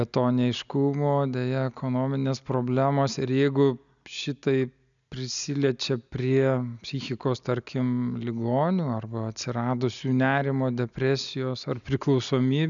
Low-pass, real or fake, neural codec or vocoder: 7.2 kHz; real; none